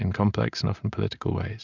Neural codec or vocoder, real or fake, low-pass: none; real; 7.2 kHz